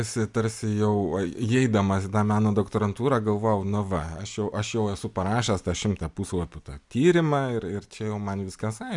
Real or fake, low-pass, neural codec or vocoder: real; 10.8 kHz; none